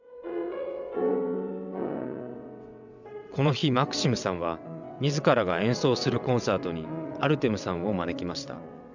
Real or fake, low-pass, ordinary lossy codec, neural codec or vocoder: fake; 7.2 kHz; none; vocoder, 22.05 kHz, 80 mel bands, WaveNeXt